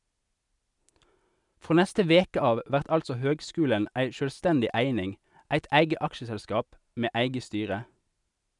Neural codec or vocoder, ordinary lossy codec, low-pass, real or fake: none; none; 10.8 kHz; real